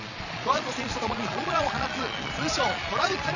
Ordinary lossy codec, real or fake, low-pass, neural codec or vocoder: none; fake; 7.2 kHz; vocoder, 22.05 kHz, 80 mel bands, Vocos